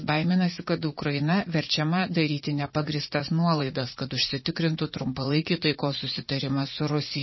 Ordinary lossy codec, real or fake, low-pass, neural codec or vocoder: MP3, 24 kbps; fake; 7.2 kHz; vocoder, 44.1 kHz, 80 mel bands, Vocos